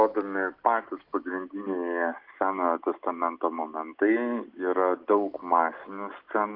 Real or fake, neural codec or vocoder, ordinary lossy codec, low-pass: real; none; Opus, 32 kbps; 5.4 kHz